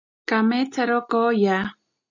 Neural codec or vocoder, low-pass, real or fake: none; 7.2 kHz; real